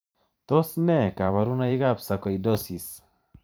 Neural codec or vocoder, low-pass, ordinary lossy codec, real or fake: vocoder, 44.1 kHz, 128 mel bands every 512 samples, BigVGAN v2; none; none; fake